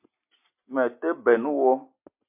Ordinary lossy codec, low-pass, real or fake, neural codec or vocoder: MP3, 32 kbps; 3.6 kHz; real; none